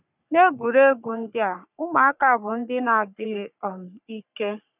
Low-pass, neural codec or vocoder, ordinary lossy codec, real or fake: 3.6 kHz; codec, 44.1 kHz, 3.4 kbps, Pupu-Codec; none; fake